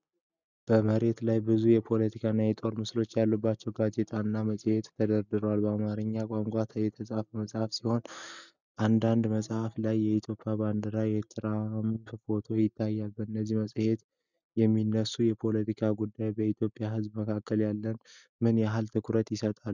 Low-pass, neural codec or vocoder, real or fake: 7.2 kHz; none; real